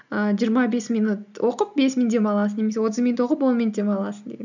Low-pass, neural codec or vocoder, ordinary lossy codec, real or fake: 7.2 kHz; none; none; real